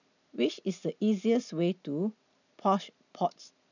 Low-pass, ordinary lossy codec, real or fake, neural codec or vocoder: 7.2 kHz; none; real; none